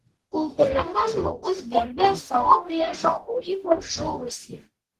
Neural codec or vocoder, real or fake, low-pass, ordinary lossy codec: codec, 44.1 kHz, 0.9 kbps, DAC; fake; 14.4 kHz; Opus, 16 kbps